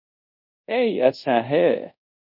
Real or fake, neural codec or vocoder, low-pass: fake; codec, 24 kHz, 0.5 kbps, DualCodec; 5.4 kHz